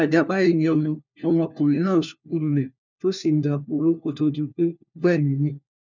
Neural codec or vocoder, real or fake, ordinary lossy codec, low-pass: codec, 16 kHz, 1 kbps, FunCodec, trained on LibriTTS, 50 frames a second; fake; none; 7.2 kHz